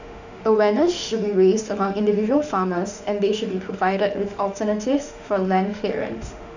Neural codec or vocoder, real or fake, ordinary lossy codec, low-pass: autoencoder, 48 kHz, 32 numbers a frame, DAC-VAE, trained on Japanese speech; fake; none; 7.2 kHz